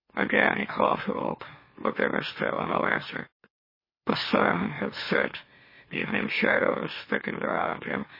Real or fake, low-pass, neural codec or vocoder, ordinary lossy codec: fake; 5.4 kHz; autoencoder, 44.1 kHz, a latent of 192 numbers a frame, MeloTTS; MP3, 24 kbps